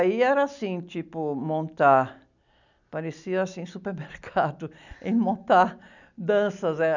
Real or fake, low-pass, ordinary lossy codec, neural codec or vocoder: real; 7.2 kHz; none; none